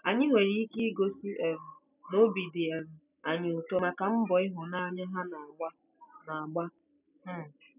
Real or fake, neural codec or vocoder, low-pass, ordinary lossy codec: real; none; 3.6 kHz; none